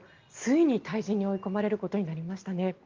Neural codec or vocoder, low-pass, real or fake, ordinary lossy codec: none; 7.2 kHz; real; Opus, 32 kbps